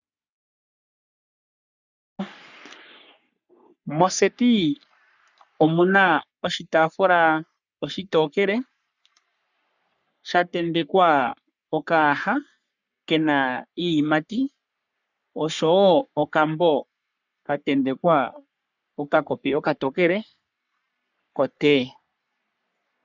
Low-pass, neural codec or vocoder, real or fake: 7.2 kHz; codec, 44.1 kHz, 3.4 kbps, Pupu-Codec; fake